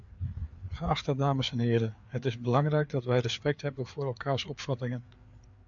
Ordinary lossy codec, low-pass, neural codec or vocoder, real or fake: MP3, 64 kbps; 7.2 kHz; codec, 16 kHz, 4 kbps, FreqCodec, larger model; fake